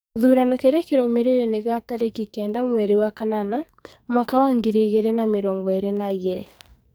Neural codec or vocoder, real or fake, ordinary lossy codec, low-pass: codec, 44.1 kHz, 2.6 kbps, SNAC; fake; none; none